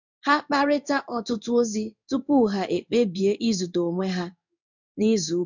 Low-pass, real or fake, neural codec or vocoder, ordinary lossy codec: 7.2 kHz; fake; codec, 16 kHz in and 24 kHz out, 1 kbps, XY-Tokenizer; none